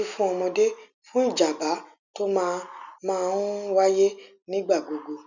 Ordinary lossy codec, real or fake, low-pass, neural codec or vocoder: none; real; 7.2 kHz; none